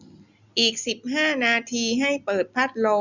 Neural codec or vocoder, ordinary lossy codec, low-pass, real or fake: none; none; 7.2 kHz; real